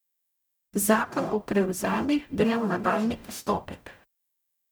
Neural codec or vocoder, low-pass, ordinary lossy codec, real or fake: codec, 44.1 kHz, 0.9 kbps, DAC; none; none; fake